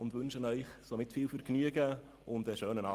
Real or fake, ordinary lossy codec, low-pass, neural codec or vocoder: real; Opus, 32 kbps; 14.4 kHz; none